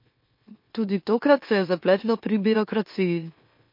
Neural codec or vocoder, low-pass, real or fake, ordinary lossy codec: autoencoder, 44.1 kHz, a latent of 192 numbers a frame, MeloTTS; 5.4 kHz; fake; MP3, 32 kbps